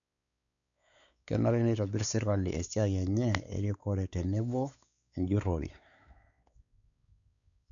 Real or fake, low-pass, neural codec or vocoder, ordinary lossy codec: fake; 7.2 kHz; codec, 16 kHz, 4 kbps, X-Codec, WavLM features, trained on Multilingual LibriSpeech; none